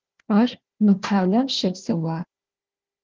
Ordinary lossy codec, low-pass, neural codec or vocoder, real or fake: Opus, 16 kbps; 7.2 kHz; codec, 16 kHz, 1 kbps, FunCodec, trained on Chinese and English, 50 frames a second; fake